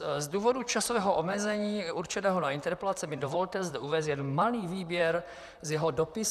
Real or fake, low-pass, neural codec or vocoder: fake; 14.4 kHz; vocoder, 44.1 kHz, 128 mel bands, Pupu-Vocoder